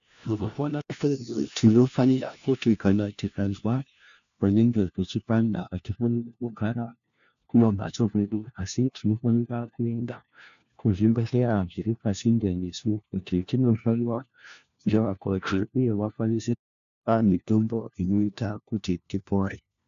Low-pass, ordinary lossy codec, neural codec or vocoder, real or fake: 7.2 kHz; AAC, 96 kbps; codec, 16 kHz, 1 kbps, FunCodec, trained on LibriTTS, 50 frames a second; fake